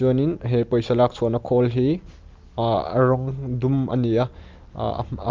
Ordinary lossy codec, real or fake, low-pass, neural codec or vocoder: Opus, 32 kbps; real; 7.2 kHz; none